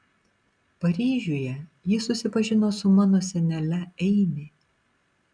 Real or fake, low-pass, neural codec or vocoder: real; 9.9 kHz; none